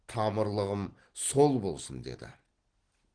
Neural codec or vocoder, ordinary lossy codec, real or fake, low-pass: none; Opus, 16 kbps; real; 9.9 kHz